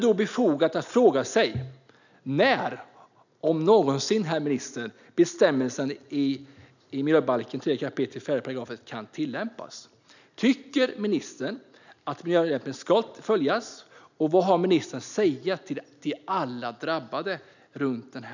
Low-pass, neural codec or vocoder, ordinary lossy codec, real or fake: 7.2 kHz; none; none; real